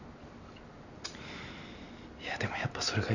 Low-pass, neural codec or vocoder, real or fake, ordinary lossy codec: 7.2 kHz; none; real; none